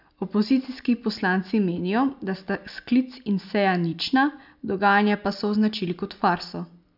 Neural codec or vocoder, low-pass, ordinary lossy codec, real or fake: none; 5.4 kHz; none; real